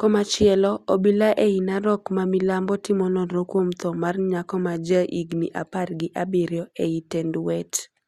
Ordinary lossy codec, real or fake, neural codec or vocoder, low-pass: Opus, 64 kbps; real; none; 14.4 kHz